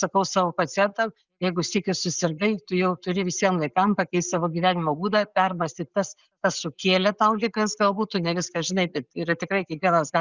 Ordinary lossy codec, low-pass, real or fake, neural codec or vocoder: Opus, 64 kbps; 7.2 kHz; real; none